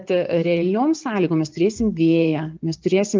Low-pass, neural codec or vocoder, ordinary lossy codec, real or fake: 7.2 kHz; vocoder, 24 kHz, 100 mel bands, Vocos; Opus, 24 kbps; fake